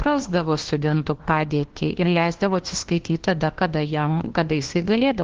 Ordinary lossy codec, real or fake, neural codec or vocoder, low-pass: Opus, 16 kbps; fake; codec, 16 kHz, 1 kbps, FunCodec, trained on LibriTTS, 50 frames a second; 7.2 kHz